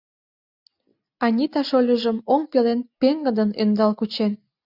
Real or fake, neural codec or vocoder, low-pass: real; none; 5.4 kHz